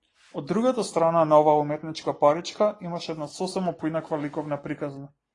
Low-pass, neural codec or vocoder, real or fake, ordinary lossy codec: 10.8 kHz; none; real; AAC, 32 kbps